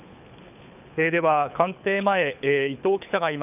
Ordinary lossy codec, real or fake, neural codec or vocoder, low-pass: none; fake; codec, 24 kHz, 6 kbps, HILCodec; 3.6 kHz